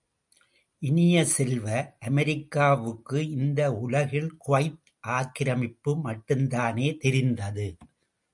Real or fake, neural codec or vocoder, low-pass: real; none; 10.8 kHz